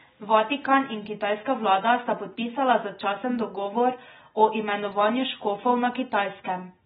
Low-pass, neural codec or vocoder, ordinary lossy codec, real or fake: 10.8 kHz; none; AAC, 16 kbps; real